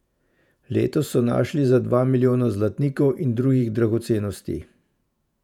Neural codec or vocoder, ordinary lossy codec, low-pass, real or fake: vocoder, 48 kHz, 128 mel bands, Vocos; none; 19.8 kHz; fake